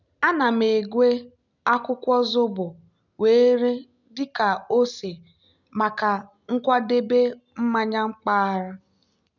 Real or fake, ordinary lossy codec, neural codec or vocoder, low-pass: real; none; none; 7.2 kHz